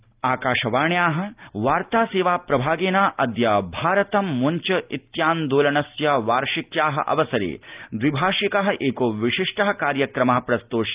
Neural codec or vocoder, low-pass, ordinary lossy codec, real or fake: none; 3.6 kHz; Opus, 24 kbps; real